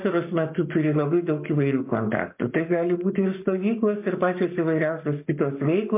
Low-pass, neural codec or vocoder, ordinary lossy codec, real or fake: 3.6 kHz; codec, 44.1 kHz, 7.8 kbps, Pupu-Codec; MP3, 32 kbps; fake